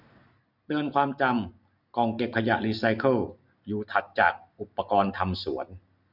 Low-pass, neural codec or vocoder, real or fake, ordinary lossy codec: 5.4 kHz; none; real; none